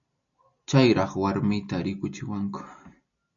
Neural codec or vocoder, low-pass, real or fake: none; 7.2 kHz; real